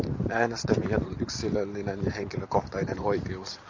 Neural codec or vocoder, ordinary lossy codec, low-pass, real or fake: vocoder, 44.1 kHz, 128 mel bands every 512 samples, BigVGAN v2; AAC, 48 kbps; 7.2 kHz; fake